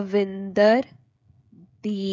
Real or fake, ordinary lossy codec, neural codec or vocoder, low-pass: fake; none; codec, 16 kHz, 16 kbps, FreqCodec, smaller model; none